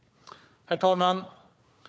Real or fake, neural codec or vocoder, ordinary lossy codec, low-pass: fake; codec, 16 kHz, 4 kbps, FunCodec, trained on Chinese and English, 50 frames a second; none; none